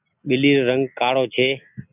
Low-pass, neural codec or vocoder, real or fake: 3.6 kHz; none; real